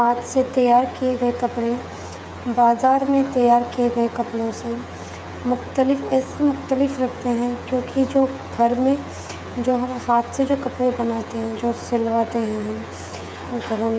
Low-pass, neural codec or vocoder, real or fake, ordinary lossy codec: none; codec, 16 kHz, 8 kbps, FreqCodec, smaller model; fake; none